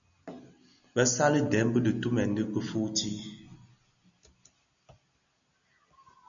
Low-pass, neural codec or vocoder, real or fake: 7.2 kHz; none; real